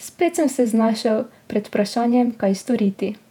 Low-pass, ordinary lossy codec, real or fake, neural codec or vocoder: 19.8 kHz; none; fake; vocoder, 48 kHz, 128 mel bands, Vocos